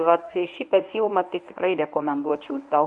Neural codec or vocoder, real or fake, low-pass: codec, 24 kHz, 0.9 kbps, WavTokenizer, medium speech release version 1; fake; 10.8 kHz